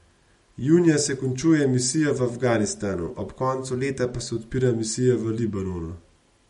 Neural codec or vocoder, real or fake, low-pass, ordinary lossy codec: none; real; 19.8 kHz; MP3, 48 kbps